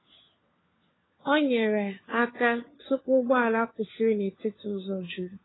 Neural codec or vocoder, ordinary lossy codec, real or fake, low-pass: codec, 16 kHz, 4 kbps, FunCodec, trained on LibriTTS, 50 frames a second; AAC, 16 kbps; fake; 7.2 kHz